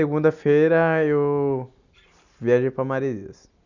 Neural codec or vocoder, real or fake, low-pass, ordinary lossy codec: none; real; 7.2 kHz; none